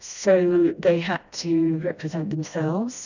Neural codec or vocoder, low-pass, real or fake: codec, 16 kHz, 1 kbps, FreqCodec, smaller model; 7.2 kHz; fake